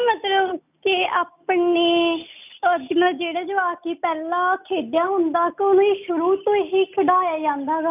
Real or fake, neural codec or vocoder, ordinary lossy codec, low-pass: real; none; none; 3.6 kHz